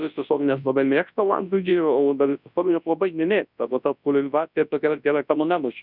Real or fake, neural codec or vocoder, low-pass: fake; codec, 24 kHz, 0.9 kbps, WavTokenizer, large speech release; 5.4 kHz